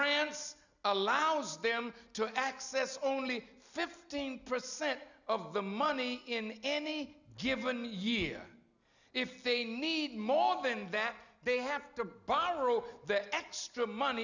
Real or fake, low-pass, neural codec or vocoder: real; 7.2 kHz; none